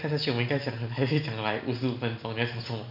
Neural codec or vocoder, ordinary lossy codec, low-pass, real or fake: none; none; 5.4 kHz; real